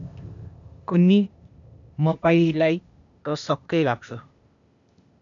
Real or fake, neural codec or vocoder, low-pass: fake; codec, 16 kHz, 0.8 kbps, ZipCodec; 7.2 kHz